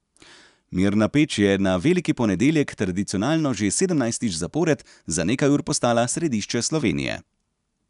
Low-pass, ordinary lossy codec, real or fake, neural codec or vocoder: 10.8 kHz; none; real; none